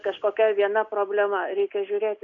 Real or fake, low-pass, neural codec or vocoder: real; 7.2 kHz; none